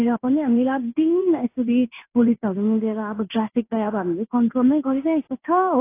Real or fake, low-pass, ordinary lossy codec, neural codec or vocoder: fake; 3.6 kHz; AAC, 24 kbps; codec, 16 kHz in and 24 kHz out, 1 kbps, XY-Tokenizer